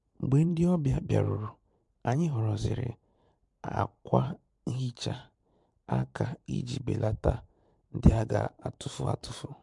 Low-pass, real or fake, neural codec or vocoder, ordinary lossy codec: 10.8 kHz; fake; vocoder, 44.1 kHz, 128 mel bands every 512 samples, BigVGAN v2; MP3, 64 kbps